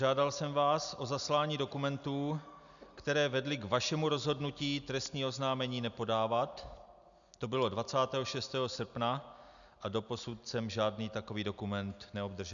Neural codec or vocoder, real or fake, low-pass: none; real; 7.2 kHz